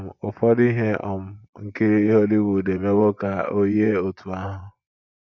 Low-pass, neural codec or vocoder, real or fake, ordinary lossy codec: 7.2 kHz; vocoder, 24 kHz, 100 mel bands, Vocos; fake; none